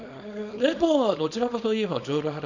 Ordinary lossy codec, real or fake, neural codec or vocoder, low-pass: none; fake; codec, 24 kHz, 0.9 kbps, WavTokenizer, small release; 7.2 kHz